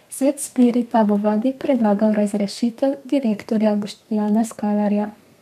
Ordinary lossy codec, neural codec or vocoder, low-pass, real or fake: none; codec, 32 kHz, 1.9 kbps, SNAC; 14.4 kHz; fake